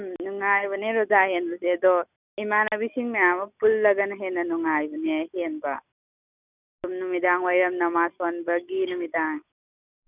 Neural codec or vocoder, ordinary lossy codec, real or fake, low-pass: none; none; real; 3.6 kHz